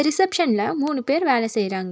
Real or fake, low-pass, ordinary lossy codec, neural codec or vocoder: real; none; none; none